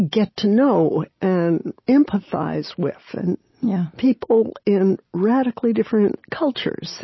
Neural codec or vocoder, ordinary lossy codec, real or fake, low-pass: none; MP3, 24 kbps; real; 7.2 kHz